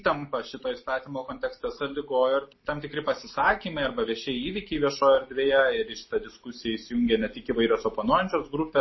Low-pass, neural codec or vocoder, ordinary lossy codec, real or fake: 7.2 kHz; none; MP3, 24 kbps; real